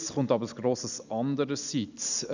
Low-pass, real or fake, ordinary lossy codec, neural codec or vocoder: 7.2 kHz; real; none; none